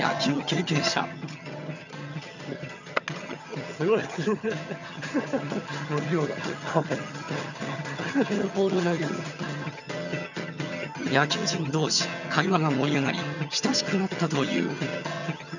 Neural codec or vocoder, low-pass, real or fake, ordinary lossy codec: vocoder, 22.05 kHz, 80 mel bands, HiFi-GAN; 7.2 kHz; fake; none